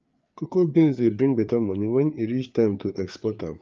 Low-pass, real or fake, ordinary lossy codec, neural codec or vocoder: 7.2 kHz; fake; Opus, 24 kbps; codec, 16 kHz, 4 kbps, FreqCodec, larger model